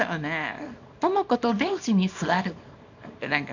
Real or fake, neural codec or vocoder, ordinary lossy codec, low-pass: fake; codec, 24 kHz, 0.9 kbps, WavTokenizer, small release; none; 7.2 kHz